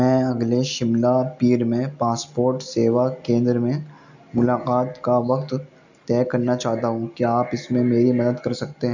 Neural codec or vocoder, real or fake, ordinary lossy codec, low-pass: none; real; none; 7.2 kHz